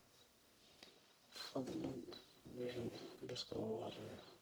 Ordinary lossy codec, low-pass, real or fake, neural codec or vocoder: none; none; fake; codec, 44.1 kHz, 1.7 kbps, Pupu-Codec